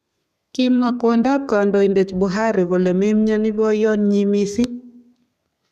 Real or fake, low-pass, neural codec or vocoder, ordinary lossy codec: fake; 14.4 kHz; codec, 32 kHz, 1.9 kbps, SNAC; none